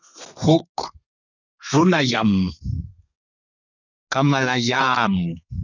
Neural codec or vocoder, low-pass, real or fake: codec, 32 kHz, 1.9 kbps, SNAC; 7.2 kHz; fake